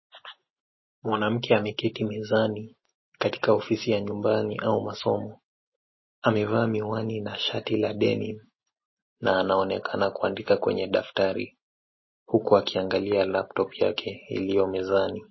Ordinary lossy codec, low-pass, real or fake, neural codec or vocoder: MP3, 24 kbps; 7.2 kHz; real; none